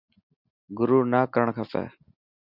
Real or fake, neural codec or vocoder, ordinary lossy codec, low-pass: real; none; Opus, 64 kbps; 5.4 kHz